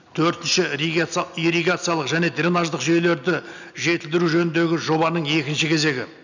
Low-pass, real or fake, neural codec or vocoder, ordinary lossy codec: 7.2 kHz; real; none; none